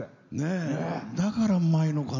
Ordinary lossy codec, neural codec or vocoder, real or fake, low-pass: none; none; real; 7.2 kHz